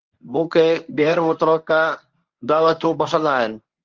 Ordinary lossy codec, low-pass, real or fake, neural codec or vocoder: Opus, 32 kbps; 7.2 kHz; fake; codec, 24 kHz, 0.9 kbps, WavTokenizer, medium speech release version 1